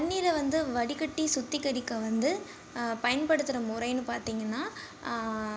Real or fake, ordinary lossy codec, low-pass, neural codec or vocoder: real; none; none; none